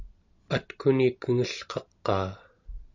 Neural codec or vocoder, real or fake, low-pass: none; real; 7.2 kHz